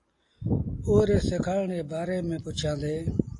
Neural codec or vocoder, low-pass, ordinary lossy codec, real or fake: none; 10.8 kHz; AAC, 48 kbps; real